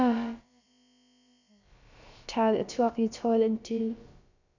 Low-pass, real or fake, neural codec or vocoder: 7.2 kHz; fake; codec, 16 kHz, about 1 kbps, DyCAST, with the encoder's durations